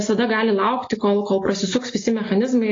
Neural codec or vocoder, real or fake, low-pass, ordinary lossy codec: none; real; 7.2 kHz; AAC, 32 kbps